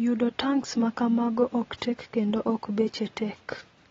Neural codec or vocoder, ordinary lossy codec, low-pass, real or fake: none; AAC, 24 kbps; 7.2 kHz; real